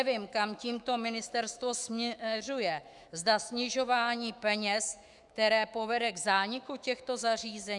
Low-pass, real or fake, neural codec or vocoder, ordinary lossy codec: 10.8 kHz; fake; autoencoder, 48 kHz, 128 numbers a frame, DAC-VAE, trained on Japanese speech; Opus, 64 kbps